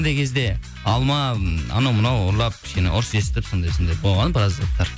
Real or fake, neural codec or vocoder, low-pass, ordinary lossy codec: real; none; none; none